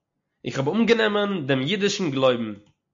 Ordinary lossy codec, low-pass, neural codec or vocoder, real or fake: MP3, 48 kbps; 7.2 kHz; none; real